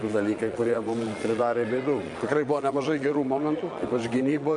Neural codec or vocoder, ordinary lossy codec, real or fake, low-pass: vocoder, 22.05 kHz, 80 mel bands, Vocos; MP3, 64 kbps; fake; 9.9 kHz